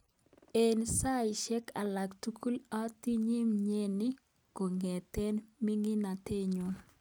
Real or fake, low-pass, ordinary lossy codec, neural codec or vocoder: real; none; none; none